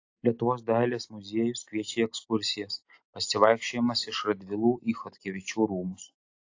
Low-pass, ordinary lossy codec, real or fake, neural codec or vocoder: 7.2 kHz; AAC, 48 kbps; real; none